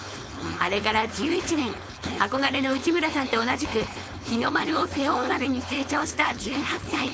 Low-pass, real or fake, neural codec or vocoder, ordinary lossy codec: none; fake; codec, 16 kHz, 4.8 kbps, FACodec; none